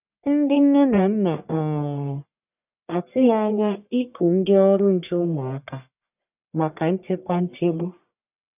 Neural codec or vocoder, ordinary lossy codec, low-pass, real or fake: codec, 44.1 kHz, 1.7 kbps, Pupu-Codec; none; 3.6 kHz; fake